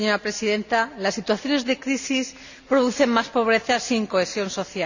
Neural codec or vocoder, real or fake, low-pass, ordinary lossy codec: none; real; 7.2 kHz; none